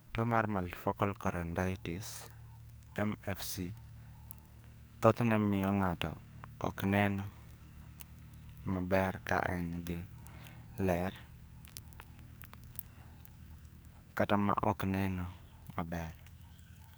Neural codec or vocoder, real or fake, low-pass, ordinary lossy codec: codec, 44.1 kHz, 2.6 kbps, SNAC; fake; none; none